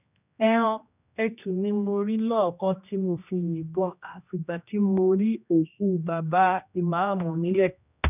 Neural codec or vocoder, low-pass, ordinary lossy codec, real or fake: codec, 16 kHz, 1 kbps, X-Codec, HuBERT features, trained on general audio; 3.6 kHz; none; fake